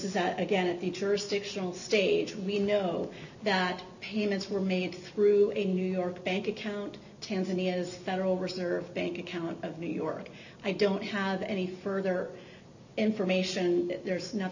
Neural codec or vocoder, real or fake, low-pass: none; real; 7.2 kHz